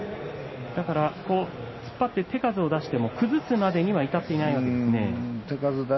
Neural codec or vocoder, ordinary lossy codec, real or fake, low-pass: none; MP3, 24 kbps; real; 7.2 kHz